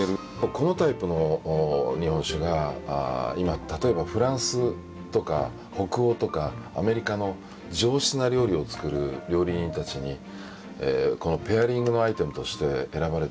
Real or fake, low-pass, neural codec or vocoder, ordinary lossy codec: real; none; none; none